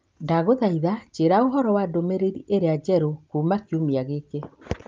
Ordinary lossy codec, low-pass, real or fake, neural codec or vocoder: Opus, 24 kbps; 7.2 kHz; real; none